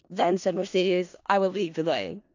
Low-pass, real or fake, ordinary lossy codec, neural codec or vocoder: 7.2 kHz; fake; AAC, 48 kbps; codec, 16 kHz in and 24 kHz out, 0.4 kbps, LongCat-Audio-Codec, four codebook decoder